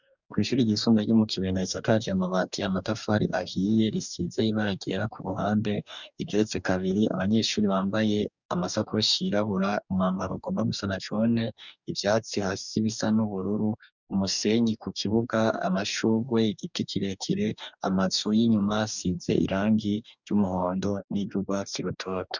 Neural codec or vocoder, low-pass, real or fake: codec, 44.1 kHz, 2.6 kbps, DAC; 7.2 kHz; fake